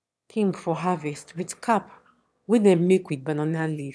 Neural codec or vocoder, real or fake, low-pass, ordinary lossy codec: autoencoder, 22.05 kHz, a latent of 192 numbers a frame, VITS, trained on one speaker; fake; none; none